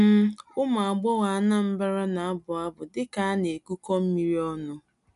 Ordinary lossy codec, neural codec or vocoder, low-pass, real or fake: none; none; 10.8 kHz; real